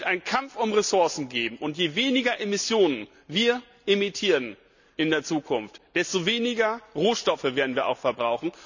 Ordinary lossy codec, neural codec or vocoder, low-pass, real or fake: none; none; 7.2 kHz; real